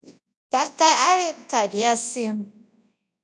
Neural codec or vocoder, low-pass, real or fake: codec, 24 kHz, 0.9 kbps, WavTokenizer, large speech release; 10.8 kHz; fake